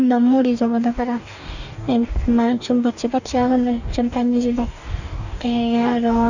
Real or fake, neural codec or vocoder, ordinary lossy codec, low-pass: fake; codec, 44.1 kHz, 2.6 kbps, DAC; none; 7.2 kHz